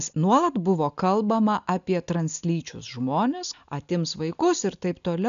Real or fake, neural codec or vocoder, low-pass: real; none; 7.2 kHz